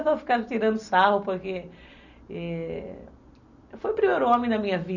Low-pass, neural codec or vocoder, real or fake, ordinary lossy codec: 7.2 kHz; none; real; none